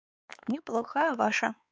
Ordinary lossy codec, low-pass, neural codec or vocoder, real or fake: none; none; codec, 16 kHz, 4 kbps, X-Codec, WavLM features, trained on Multilingual LibriSpeech; fake